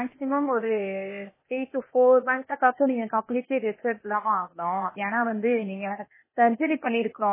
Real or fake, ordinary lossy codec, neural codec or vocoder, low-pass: fake; MP3, 16 kbps; codec, 16 kHz, 0.8 kbps, ZipCodec; 3.6 kHz